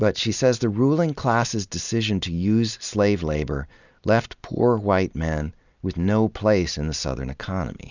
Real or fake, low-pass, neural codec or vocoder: real; 7.2 kHz; none